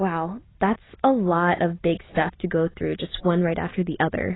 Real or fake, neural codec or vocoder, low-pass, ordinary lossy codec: real; none; 7.2 kHz; AAC, 16 kbps